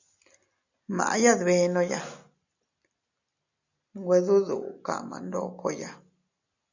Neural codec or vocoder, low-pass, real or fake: none; 7.2 kHz; real